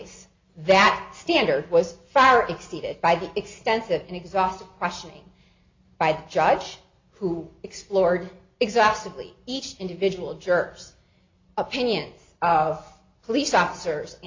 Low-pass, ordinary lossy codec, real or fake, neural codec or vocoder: 7.2 kHz; MP3, 64 kbps; real; none